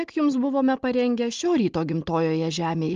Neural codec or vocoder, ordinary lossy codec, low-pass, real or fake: none; Opus, 32 kbps; 7.2 kHz; real